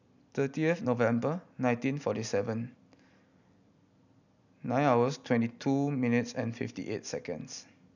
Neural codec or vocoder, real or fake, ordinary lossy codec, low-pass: none; real; none; 7.2 kHz